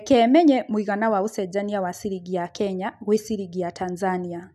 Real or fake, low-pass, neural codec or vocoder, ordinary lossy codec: real; 14.4 kHz; none; none